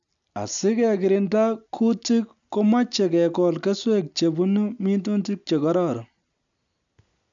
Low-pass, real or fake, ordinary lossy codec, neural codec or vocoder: 7.2 kHz; real; none; none